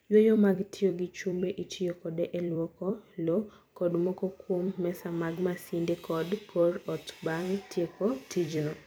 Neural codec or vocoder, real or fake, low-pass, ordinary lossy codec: vocoder, 44.1 kHz, 128 mel bands every 256 samples, BigVGAN v2; fake; none; none